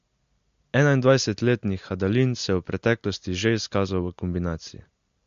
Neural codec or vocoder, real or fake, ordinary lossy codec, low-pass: none; real; MP3, 48 kbps; 7.2 kHz